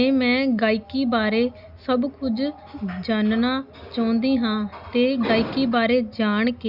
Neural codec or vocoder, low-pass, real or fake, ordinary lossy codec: none; 5.4 kHz; real; Opus, 64 kbps